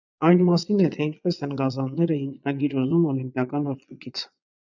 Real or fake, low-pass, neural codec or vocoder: fake; 7.2 kHz; vocoder, 22.05 kHz, 80 mel bands, Vocos